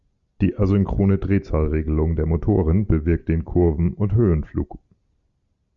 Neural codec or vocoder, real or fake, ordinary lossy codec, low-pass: none; real; Opus, 64 kbps; 7.2 kHz